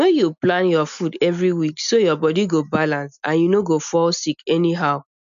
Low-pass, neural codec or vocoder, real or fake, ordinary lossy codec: 7.2 kHz; none; real; none